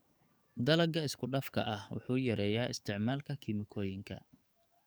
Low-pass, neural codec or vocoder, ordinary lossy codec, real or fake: none; codec, 44.1 kHz, 7.8 kbps, DAC; none; fake